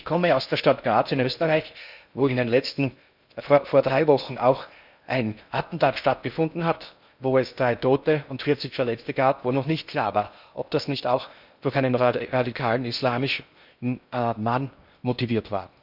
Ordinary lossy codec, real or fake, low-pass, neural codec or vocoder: none; fake; 5.4 kHz; codec, 16 kHz in and 24 kHz out, 0.6 kbps, FocalCodec, streaming, 4096 codes